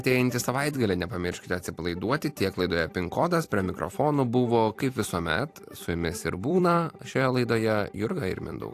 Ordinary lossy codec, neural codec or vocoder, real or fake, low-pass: AAC, 64 kbps; vocoder, 44.1 kHz, 128 mel bands every 256 samples, BigVGAN v2; fake; 14.4 kHz